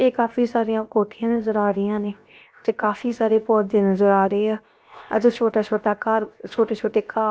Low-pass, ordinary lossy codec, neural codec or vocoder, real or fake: none; none; codec, 16 kHz, 0.7 kbps, FocalCodec; fake